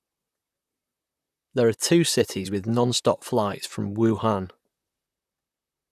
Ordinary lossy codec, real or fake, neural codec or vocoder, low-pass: none; fake; vocoder, 44.1 kHz, 128 mel bands, Pupu-Vocoder; 14.4 kHz